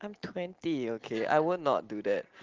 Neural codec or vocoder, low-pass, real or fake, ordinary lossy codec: none; 7.2 kHz; real; Opus, 16 kbps